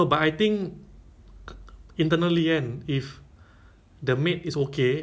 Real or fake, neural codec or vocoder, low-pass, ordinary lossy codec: real; none; none; none